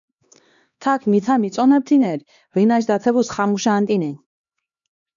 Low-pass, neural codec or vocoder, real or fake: 7.2 kHz; codec, 16 kHz, 2 kbps, X-Codec, HuBERT features, trained on LibriSpeech; fake